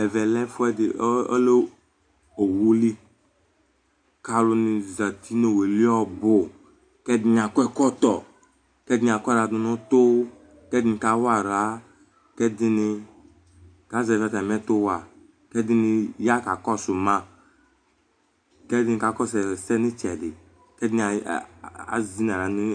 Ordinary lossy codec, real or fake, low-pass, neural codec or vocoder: AAC, 64 kbps; real; 9.9 kHz; none